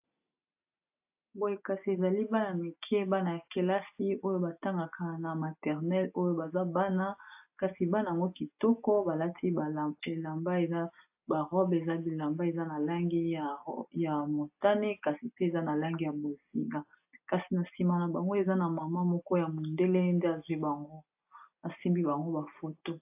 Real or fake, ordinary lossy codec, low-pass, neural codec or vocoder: real; AAC, 32 kbps; 3.6 kHz; none